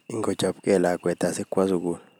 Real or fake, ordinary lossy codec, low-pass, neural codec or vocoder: real; none; none; none